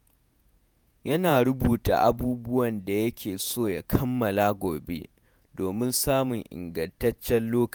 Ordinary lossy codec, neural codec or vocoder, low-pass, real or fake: none; vocoder, 48 kHz, 128 mel bands, Vocos; none; fake